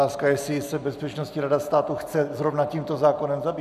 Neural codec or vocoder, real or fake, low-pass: none; real; 14.4 kHz